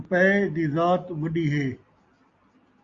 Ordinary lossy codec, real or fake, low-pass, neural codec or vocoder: Opus, 64 kbps; real; 7.2 kHz; none